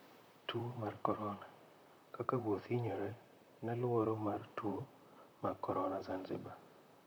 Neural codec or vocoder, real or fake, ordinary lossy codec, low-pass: vocoder, 44.1 kHz, 128 mel bands, Pupu-Vocoder; fake; none; none